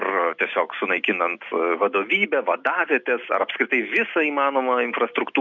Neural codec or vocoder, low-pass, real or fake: none; 7.2 kHz; real